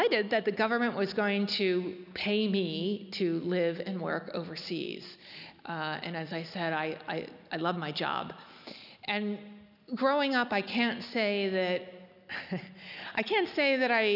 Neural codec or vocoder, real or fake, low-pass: none; real; 5.4 kHz